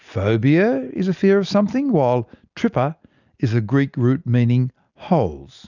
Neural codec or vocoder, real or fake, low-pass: none; real; 7.2 kHz